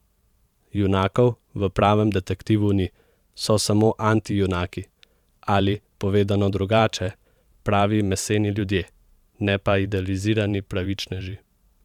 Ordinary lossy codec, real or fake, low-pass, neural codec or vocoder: none; fake; 19.8 kHz; vocoder, 44.1 kHz, 128 mel bands every 512 samples, BigVGAN v2